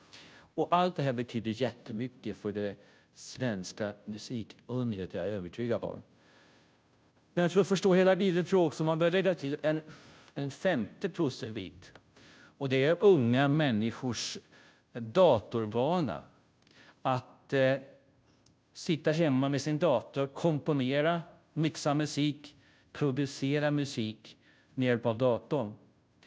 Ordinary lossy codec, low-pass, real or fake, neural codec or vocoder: none; none; fake; codec, 16 kHz, 0.5 kbps, FunCodec, trained on Chinese and English, 25 frames a second